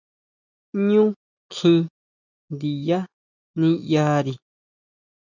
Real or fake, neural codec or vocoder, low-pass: real; none; 7.2 kHz